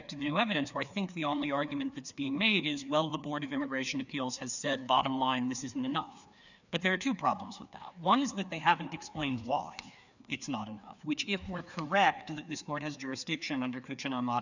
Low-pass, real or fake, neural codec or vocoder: 7.2 kHz; fake; codec, 16 kHz, 2 kbps, FreqCodec, larger model